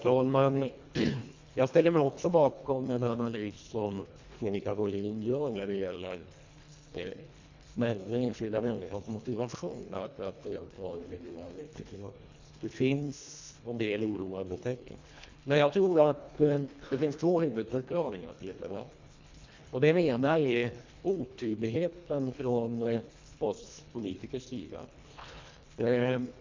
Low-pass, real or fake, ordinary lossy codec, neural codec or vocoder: 7.2 kHz; fake; MP3, 64 kbps; codec, 24 kHz, 1.5 kbps, HILCodec